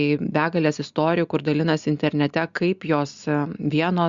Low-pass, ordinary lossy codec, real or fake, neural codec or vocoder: 7.2 kHz; Opus, 64 kbps; real; none